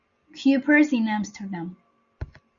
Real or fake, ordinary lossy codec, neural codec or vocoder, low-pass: real; Opus, 64 kbps; none; 7.2 kHz